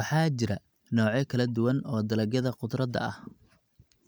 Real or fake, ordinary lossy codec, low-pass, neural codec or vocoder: real; none; none; none